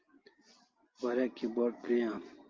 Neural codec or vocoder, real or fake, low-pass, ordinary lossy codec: none; real; 7.2 kHz; Opus, 32 kbps